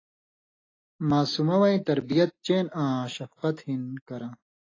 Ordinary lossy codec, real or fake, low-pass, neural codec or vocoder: AAC, 32 kbps; real; 7.2 kHz; none